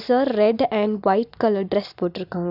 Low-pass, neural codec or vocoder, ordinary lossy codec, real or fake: 5.4 kHz; none; none; real